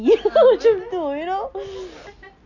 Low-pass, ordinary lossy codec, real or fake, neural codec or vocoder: 7.2 kHz; none; real; none